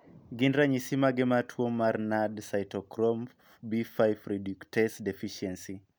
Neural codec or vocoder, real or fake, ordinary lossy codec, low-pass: none; real; none; none